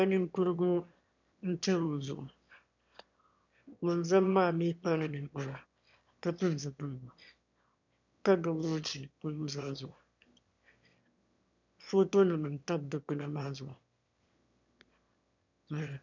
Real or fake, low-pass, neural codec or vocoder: fake; 7.2 kHz; autoencoder, 22.05 kHz, a latent of 192 numbers a frame, VITS, trained on one speaker